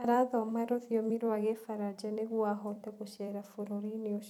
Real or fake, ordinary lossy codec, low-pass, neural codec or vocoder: fake; none; 19.8 kHz; vocoder, 44.1 kHz, 128 mel bands every 256 samples, BigVGAN v2